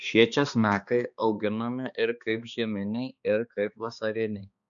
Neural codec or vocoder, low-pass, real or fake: codec, 16 kHz, 2 kbps, X-Codec, HuBERT features, trained on balanced general audio; 7.2 kHz; fake